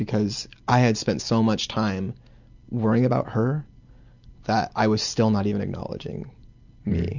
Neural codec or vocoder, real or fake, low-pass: none; real; 7.2 kHz